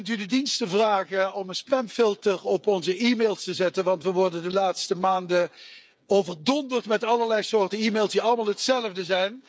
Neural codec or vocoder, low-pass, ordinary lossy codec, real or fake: codec, 16 kHz, 8 kbps, FreqCodec, smaller model; none; none; fake